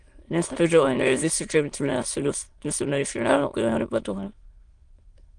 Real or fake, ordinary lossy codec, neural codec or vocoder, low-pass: fake; Opus, 24 kbps; autoencoder, 22.05 kHz, a latent of 192 numbers a frame, VITS, trained on many speakers; 9.9 kHz